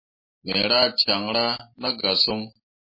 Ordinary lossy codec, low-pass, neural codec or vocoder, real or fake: MP3, 24 kbps; 5.4 kHz; none; real